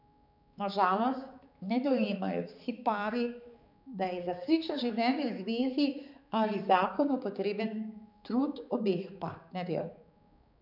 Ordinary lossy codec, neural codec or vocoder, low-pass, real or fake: none; codec, 16 kHz, 4 kbps, X-Codec, HuBERT features, trained on balanced general audio; 5.4 kHz; fake